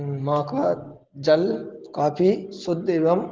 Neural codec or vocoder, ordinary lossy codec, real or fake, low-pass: none; Opus, 16 kbps; real; 7.2 kHz